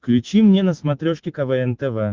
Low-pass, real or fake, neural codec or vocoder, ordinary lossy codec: 7.2 kHz; real; none; Opus, 16 kbps